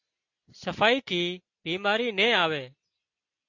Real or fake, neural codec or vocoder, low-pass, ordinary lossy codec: real; none; 7.2 kHz; AAC, 48 kbps